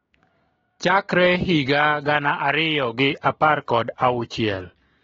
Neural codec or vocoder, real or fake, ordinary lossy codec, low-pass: codec, 16 kHz, 6 kbps, DAC; fake; AAC, 24 kbps; 7.2 kHz